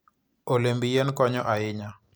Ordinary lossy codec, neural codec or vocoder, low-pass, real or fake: none; none; none; real